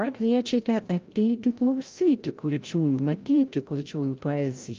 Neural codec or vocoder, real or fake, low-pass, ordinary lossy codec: codec, 16 kHz, 0.5 kbps, FreqCodec, larger model; fake; 7.2 kHz; Opus, 32 kbps